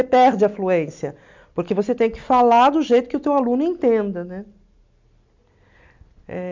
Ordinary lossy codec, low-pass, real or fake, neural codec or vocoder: MP3, 64 kbps; 7.2 kHz; real; none